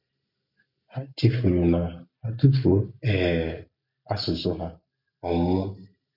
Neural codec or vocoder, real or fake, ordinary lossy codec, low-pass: none; real; none; 5.4 kHz